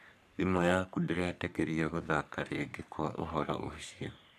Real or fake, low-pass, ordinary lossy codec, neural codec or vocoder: fake; 14.4 kHz; none; codec, 44.1 kHz, 3.4 kbps, Pupu-Codec